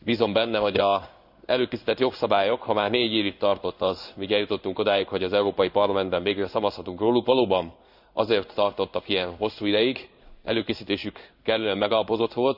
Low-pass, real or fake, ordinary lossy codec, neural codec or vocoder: 5.4 kHz; fake; none; codec, 16 kHz in and 24 kHz out, 1 kbps, XY-Tokenizer